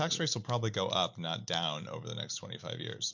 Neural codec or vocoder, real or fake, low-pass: none; real; 7.2 kHz